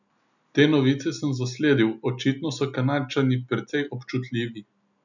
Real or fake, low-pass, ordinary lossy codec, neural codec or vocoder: real; 7.2 kHz; none; none